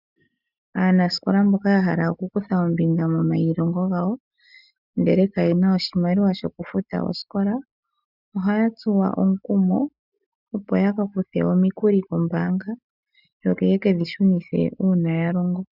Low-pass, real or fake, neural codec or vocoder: 5.4 kHz; real; none